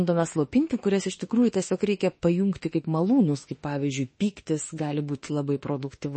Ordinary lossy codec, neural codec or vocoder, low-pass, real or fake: MP3, 32 kbps; autoencoder, 48 kHz, 32 numbers a frame, DAC-VAE, trained on Japanese speech; 10.8 kHz; fake